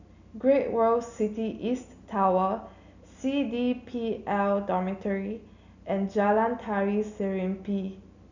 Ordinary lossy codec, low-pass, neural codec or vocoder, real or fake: none; 7.2 kHz; none; real